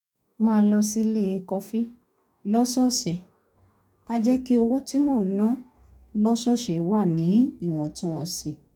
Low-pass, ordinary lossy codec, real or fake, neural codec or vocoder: 19.8 kHz; none; fake; codec, 44.1 kHz, 2.6 kbps, DAC